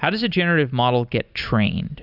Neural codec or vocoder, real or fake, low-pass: none; real; 5.4 kHz